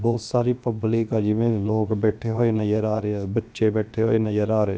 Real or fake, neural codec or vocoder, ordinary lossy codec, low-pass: fake; codec, 16 kHz, about 1 kbps, DyCAST, with the encoder's durations; none; none